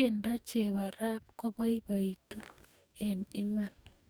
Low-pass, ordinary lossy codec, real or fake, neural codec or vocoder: none; none; fake; codec, 44.1 kHz, 2.6 kbps, DAC